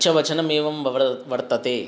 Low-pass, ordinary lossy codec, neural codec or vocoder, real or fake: none; none; none; real